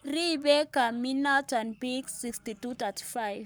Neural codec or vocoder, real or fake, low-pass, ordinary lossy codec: codec, 44.1 kHz, 7.8 kbps, Pupu-Codec; fake; none; none